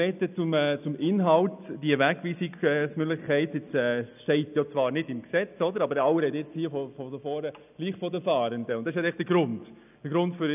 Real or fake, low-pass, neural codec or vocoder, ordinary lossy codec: real; 3.6 kHz; none; none